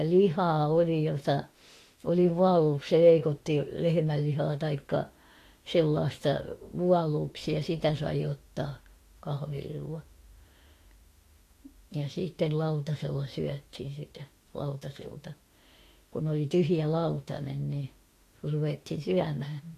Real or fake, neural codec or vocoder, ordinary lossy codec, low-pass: fake; autoencoder, 48 kHz, 32 numbers a frame, DAC-VAE, trained on Japanese speech; AAC, 48 kbps; 14.4 kHz